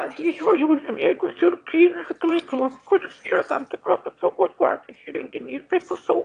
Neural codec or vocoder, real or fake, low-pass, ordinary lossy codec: autoencoder, 22.05 kHz, a latent of 192 numbers a frame, VITS, trained on one speaker; fake; 9.9 kHz; AAC, 64 kbps